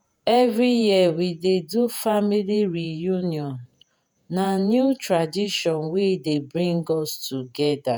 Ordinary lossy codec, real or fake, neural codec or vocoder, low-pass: none; fake; vocoder, 48 kHz, 128 mel bands, Vocos; none